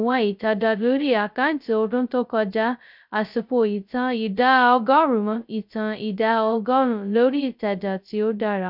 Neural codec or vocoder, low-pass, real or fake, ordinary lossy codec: codec, 16 kHz, 0.2 kbps, FocalCodec; 5.4 kHz; fake; none